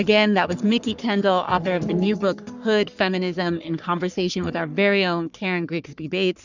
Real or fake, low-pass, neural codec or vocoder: fake; 7.2 kHz; codec, 44.1 kHz, 3.4 kbps, Pupu-Codec